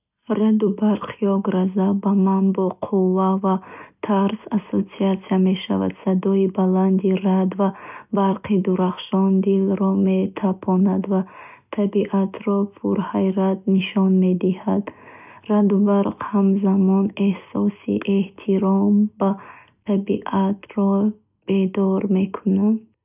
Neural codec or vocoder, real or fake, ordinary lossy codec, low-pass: none; real; none; 3.6 kHz